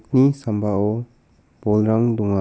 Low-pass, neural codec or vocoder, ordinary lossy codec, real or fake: none; none; none; real